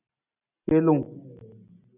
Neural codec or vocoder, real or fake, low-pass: none; real; 3.6 kHz